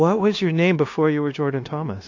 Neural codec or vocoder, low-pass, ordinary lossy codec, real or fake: codec, 16 kHz, 0.9 kbps, LongCat-Audio-Codec; 7.2 kHz; AAC, 48 kbps; fake